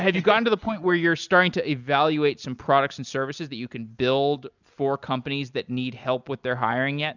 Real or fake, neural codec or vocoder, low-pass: real; none; 7.2 kHz